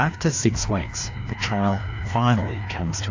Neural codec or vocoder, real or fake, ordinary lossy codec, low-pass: codec, 16 kHz, 2 kbps, FreqCodec, larger model; fake; AAC, 48 kbps; 7.2 kHz